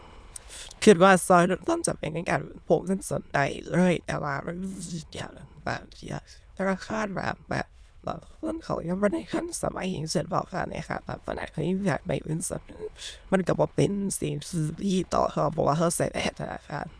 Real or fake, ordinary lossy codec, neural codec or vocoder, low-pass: fake; none; autoencoder, 22.05 kHz, a latent of 192 numbers a frame, VITS, trained on many speakers; none